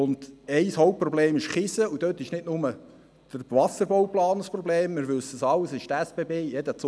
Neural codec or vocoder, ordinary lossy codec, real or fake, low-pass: none; none; real; none